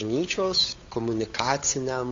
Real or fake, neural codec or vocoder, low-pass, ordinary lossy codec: fake; codec, 16 kHz, 8 kbps, FunCodec, trained on Chinese and English, 25 frames a second; 7.2 kHz; AAC, 48 kbps